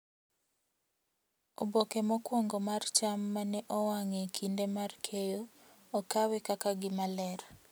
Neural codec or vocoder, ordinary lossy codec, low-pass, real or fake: none; none; none; real